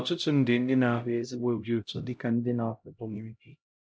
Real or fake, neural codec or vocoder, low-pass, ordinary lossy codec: fake; codec, 16 kHz, 0.5 kbps, X-Codec, HuBERT features, trained on LibriSpeech; none; none